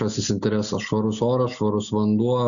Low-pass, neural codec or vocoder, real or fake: 7.2 kHz; none; real